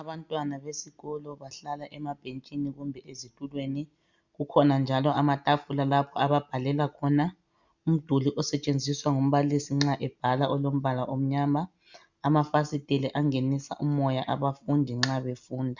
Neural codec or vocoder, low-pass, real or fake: none; 7.2 kHz; real